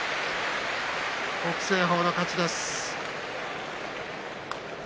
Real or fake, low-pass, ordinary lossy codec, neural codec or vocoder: real; none; none; none